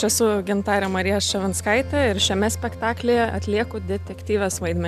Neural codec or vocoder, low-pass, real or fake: none; 14.4 kHz; real